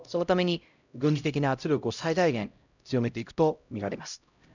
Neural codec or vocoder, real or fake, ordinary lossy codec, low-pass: codec, 16 kHz, 0.5 kbps, X-Codec, HuBERT features, trained on LibriSpeech; fake; none; 7.2 kHz